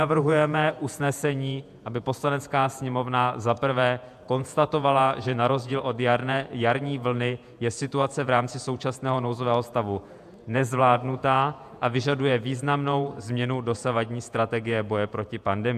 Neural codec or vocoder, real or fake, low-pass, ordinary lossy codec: vocoder, 48 kHz, 128 mel bands, Vocos; fake; 14.4 kHz; AAC, 96 kbps